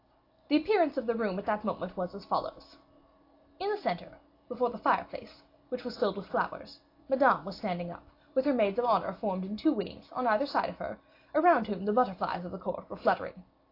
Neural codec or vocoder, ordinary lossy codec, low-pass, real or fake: none; AAC, 32 kbps; 5.4 kHz; real